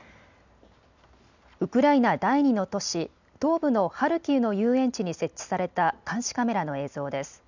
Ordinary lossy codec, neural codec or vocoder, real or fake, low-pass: none; none; real; 7.2 kHz